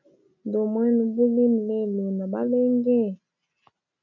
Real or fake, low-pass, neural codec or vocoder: real; 7.2 kHz; none